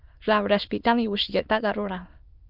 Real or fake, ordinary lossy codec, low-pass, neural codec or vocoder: fake; Opus, 24 kbps; 5.4 kHz; autoencoder, 22.05 kHz, a latent of 192 numbers a frame, VITS, trained on many speakers